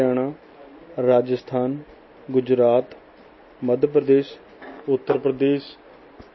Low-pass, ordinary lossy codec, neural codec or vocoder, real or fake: 7.2 kHz; MP3, 24 kbps; none; real